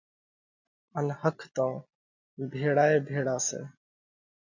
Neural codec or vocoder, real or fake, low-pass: none; real; 7.2 kHz